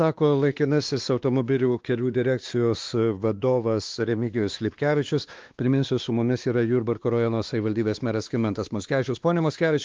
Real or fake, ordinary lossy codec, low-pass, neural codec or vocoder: fake; Opus, 32 kbps; 7.2 kHz; codec, 16 kHz, 2 kbps, X-Codec, WavLM features, trained on Multilingual LibriSpeech